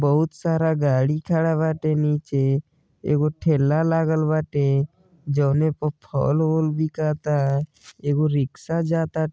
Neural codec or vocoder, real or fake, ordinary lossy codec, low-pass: none; real; none; none